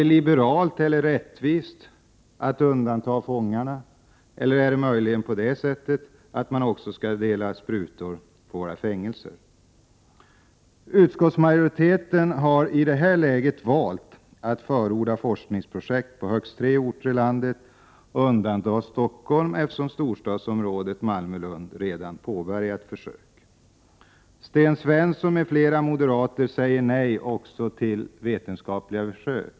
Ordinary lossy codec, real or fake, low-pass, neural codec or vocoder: none; real; none; none